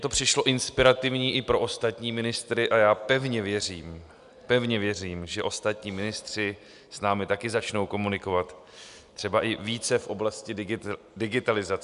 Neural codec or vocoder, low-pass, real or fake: none; 10.8 kHz; real